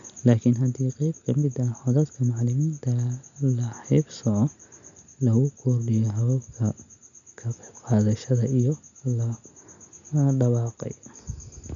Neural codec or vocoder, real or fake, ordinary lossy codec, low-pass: none; real; none; 7.2 kHz